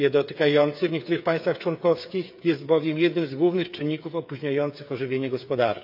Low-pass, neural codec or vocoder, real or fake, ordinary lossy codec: 5.4 kHz; codec, 16 kHz, 8 kbps, FreqCodec, smaller model; fake; AAC, 48 kbps